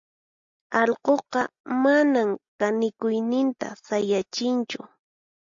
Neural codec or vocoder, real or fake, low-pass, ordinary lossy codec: none; real; 7.2 kHz; AAC, 64 kbps